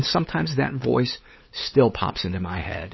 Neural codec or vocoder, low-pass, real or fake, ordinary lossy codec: codec, 16 kHz, 8 kbps, FunCodec, trained on LibriTTS, 25 frames a second; 7.2 kHz; fake; MP3, 24 kbps